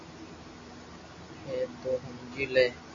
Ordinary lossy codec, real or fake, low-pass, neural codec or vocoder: MP3, 48 kbps; real; 7.2 kHz; none